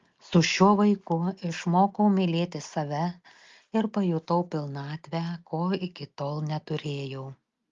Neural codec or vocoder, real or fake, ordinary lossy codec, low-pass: none; real; Opus, 32 kbps; 7.2 kHz